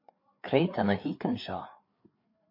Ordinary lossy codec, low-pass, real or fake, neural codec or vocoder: MP3, 32 kbps; 5.4 kHz; fake; codec, 16 kHz, 4 kbps, FreqCodec, larger model